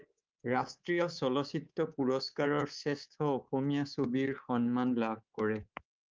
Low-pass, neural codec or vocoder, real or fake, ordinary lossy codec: 7.2 kHz; codec, 24 kHz, 3.1 kbps, DualCodec; fake; Opus, 24 kbps